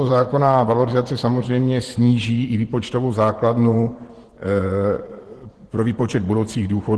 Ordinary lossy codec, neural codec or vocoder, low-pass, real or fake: Opus, 16 kbps; vocoder, 24 kHz, 100 mel bands, Vocos; 10.8 kHz; fake